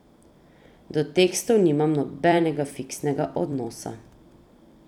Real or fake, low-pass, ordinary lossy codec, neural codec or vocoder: fake; 19.8 kHz; none; vocoder, 44.1 kHz, 128 mel bands every 256 samples, BigVGAN v2